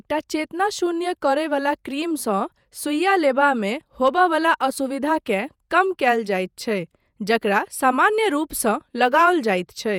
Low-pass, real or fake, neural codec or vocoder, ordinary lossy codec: 19.8 kHz; fake; vocoder, 48 kHz, 128 mel bands, Vocos; none